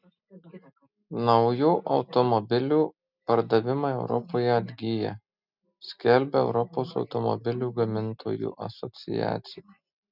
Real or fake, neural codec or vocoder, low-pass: real; none; 5.4 kHz